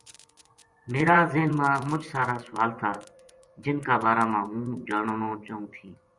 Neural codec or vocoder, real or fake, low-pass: vocoder, 24 kHz, 100 mel bands, Vocos; fake; 10.8 kHz